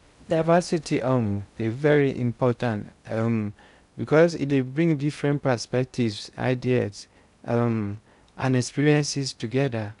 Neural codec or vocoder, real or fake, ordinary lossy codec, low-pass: codec, 16 kHz in and 24 kHz out, 0.6 kbps, FocalCodec, streaming, 2048 codes; fake; none; 10.8 kHz